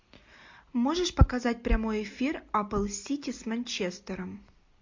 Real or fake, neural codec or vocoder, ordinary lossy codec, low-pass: real; none; MP3, 48 kbps; 7.2 kHz